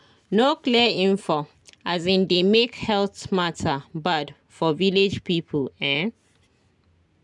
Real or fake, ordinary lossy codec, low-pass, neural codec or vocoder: real; none; 10.8 kHz; none